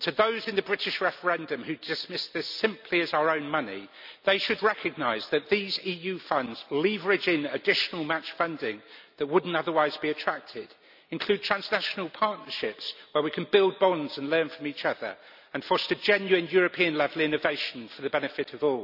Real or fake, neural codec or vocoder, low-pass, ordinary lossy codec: real; none; 5.4 kHz; none